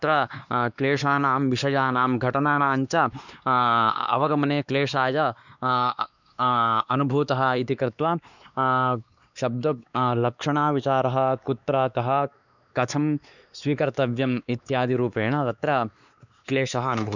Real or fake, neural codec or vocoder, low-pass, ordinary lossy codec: fake; codec, 16 kHz, 2 kbps, X-Codec, WavLM features, trained on Multilingual LibriSpeech; 7.2 kHz; none